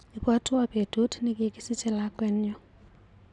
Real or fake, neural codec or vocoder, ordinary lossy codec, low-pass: real; none; none; 10.8 kHz